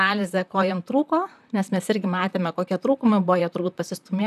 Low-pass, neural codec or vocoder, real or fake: 14.4 kHz; vocoder, 44.1 kHz, 128 mel bands, Pupu-Vocoder; fake